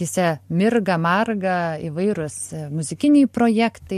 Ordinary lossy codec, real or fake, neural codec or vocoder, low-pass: MP3, 64 kbps; real; none; 14.4 kHz